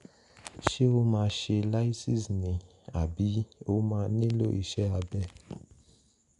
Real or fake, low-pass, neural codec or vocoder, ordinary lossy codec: real; 10.8 kHz; none; none